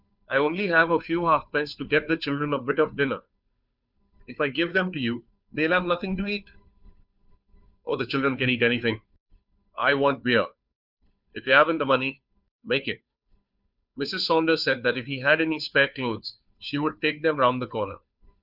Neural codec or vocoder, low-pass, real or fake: codec, 16 kHz, 2 kbps, FunCodec, trained on Chinese and English, 25 frames a second; 5.4 kHz; fake